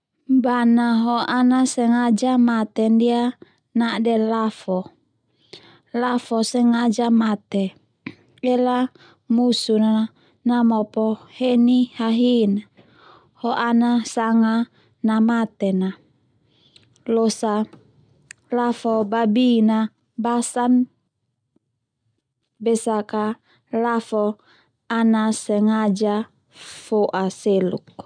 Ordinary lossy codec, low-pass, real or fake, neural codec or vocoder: none; 9.9 kHz; real; none